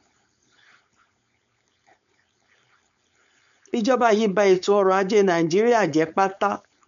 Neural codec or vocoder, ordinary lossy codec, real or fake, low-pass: codec, 16 kHz, 4.8 kbps, FACodec; none; fake; 7.2 kHz